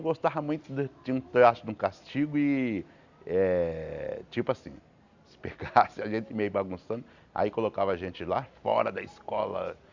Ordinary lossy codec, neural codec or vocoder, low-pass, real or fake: Opus, 64 kbps; none; 7.2 kHz; real